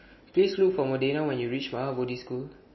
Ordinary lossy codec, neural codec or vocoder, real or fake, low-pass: MP3, 24 kbps; none; real; 7.2 kHz